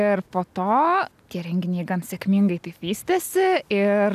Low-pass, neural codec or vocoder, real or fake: 14.4 kHz; none; real